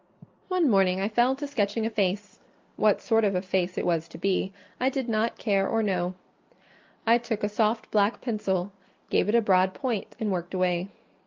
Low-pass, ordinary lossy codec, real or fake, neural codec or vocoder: 7.2 kHz; Opus, 24 kbps; real; none